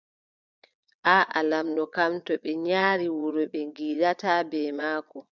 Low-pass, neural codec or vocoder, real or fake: 7.2 kHz; vocoder, 22.05 kHz, 80 mel bands, Vocos; fake